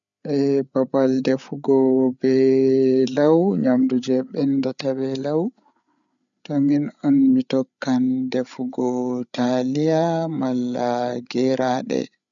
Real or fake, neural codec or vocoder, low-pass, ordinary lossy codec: fake; codec, 16 kHz, 8 kbps, FreqCodec, larger model; 7.2 kHz; none